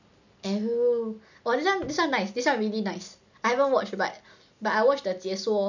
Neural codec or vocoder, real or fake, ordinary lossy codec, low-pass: none; real; none; 7.2 kHz